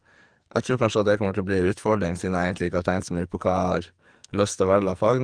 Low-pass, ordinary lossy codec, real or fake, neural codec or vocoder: 9.9 kHz; Opus, 32 kbps; fake; codec, 44.1 kHz, 2.6 kbps, SNAC